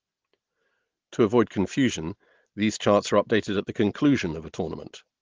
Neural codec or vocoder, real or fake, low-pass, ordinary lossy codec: none; real; 7.2 kHz; Opus, 16 kbps